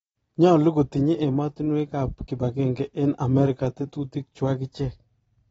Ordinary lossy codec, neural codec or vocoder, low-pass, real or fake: AAC, 24 kbps; none; 19.8 kHz; real